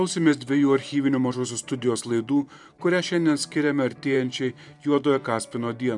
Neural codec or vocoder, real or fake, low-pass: vocoder, 44.1 kHz, 128 mel bands every 512 samples, BigVGAN v2; fake; 10.8 kHz